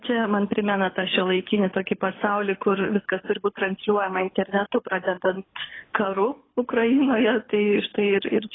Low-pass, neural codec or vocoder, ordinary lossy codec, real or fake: 7.2 kHz; codec, 24 kHz, 6 kbps, HILCodec; AAC, 16 kbps; fake